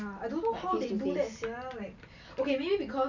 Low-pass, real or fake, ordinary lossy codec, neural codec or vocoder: 7.2 kHz; real; none; none